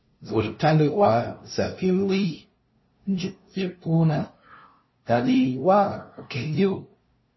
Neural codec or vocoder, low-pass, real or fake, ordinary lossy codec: codec, 16 kHz, 0.5 kbps, FunCodec, trained on LibriTTS, 25 frames a second; 7.2 kHz; fake; MP3, 24 kbps